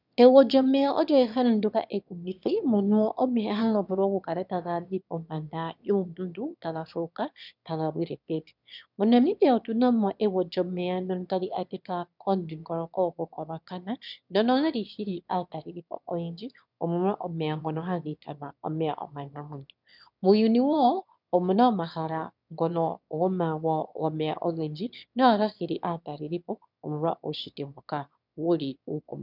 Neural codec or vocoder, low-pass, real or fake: autoencoder, 22.05 kHz, a latent of 192 numbers a frame, VITS, trained on one speaker; 5.4 kHz; fake